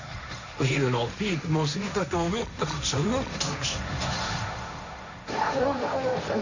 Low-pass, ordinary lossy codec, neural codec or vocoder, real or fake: 7.2 kHz; none; codec, 16 kHz, 1.1 kbps, Voila-Tokenizer; fake